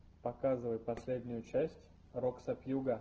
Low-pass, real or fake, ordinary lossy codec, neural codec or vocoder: 7.2 kHz; real; Opus, 16 kbps; none